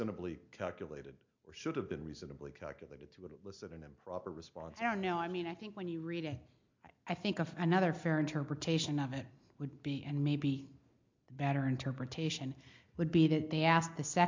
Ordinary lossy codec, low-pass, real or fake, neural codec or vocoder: MP3, 48 kbps; 7.2 kHz; real; none